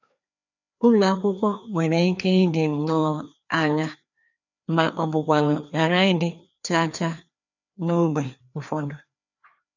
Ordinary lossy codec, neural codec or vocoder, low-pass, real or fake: none; codec, 16 kHz, 2 kbps, FreqCodec, larger model; 7.2 kHz; fake